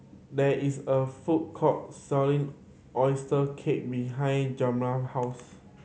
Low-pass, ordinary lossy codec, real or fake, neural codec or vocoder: none; none; real; none